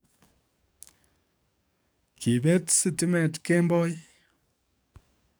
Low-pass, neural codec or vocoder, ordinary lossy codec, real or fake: none; codec, 44.1 kHz, 7.8 kbps, DAC; none; fake